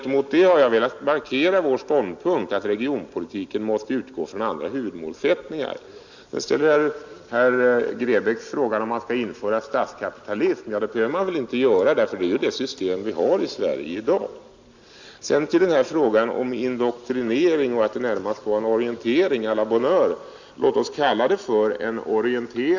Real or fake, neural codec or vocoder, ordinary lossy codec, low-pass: real; none; Opus, 64 kbps; 7.2 kHz